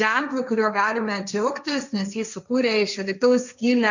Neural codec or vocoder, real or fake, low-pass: codec, 16 kHz, 1.1 kbps, Voila-Tokenizer; fake; 7.2 kHz